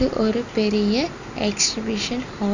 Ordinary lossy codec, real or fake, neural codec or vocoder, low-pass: Opus, 64 kbps; real; none; 7.2 kHz